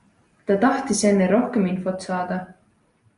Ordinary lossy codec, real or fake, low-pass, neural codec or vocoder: MP3, 96 kbps; real; 10.8 kHz; none